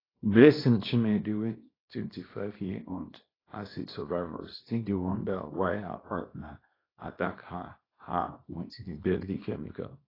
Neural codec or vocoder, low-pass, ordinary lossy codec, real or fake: codec, 24 kHz, 0.9 kbps, WavTokenizer, small release; 5.4 kHz; AAC, 24 kbps; fake